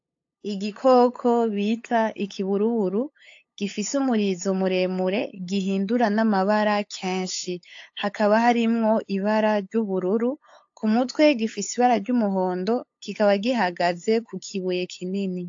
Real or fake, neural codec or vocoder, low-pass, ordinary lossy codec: fake; codec, 16 kHz, 8 kbps, FunCodec, trained on LibriTTS, 25 frames a second; 7.2 kHz; AAC, 48 kbps